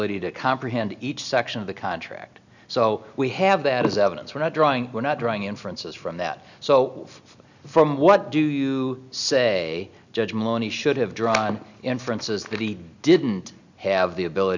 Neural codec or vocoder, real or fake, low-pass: none; real; 7.2 kHz